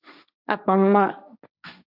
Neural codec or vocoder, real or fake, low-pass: codec, 16 kHz, 1.1 kbps, Voila-Tokenizer; fake; 5.4 kHz